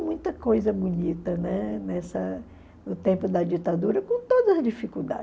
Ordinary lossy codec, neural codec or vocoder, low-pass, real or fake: none; none; none; real